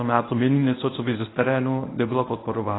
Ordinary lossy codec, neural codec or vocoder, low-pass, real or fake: AAC, 16 kbps; codec, 24 kHz, 0.9 kbps, WavTokenizer, medium speech release version 1; 7.2 kHz; fake